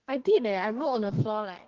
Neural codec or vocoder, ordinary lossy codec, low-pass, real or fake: codec, 32 kHz, 1.9 kbps, SNAC; Opus, 16 kbps; 7.2 kHz; fake